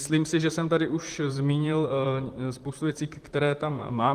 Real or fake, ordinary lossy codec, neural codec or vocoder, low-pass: fake; Opus, 24 kbps; vocoder, 44.1 kHz, 128 mel bands, Pupu-Vocoder; 14.4 kHz